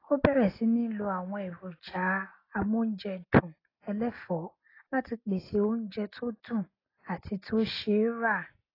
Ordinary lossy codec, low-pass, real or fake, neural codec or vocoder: AAC, 24 kbps; 5.4 kHz; real; none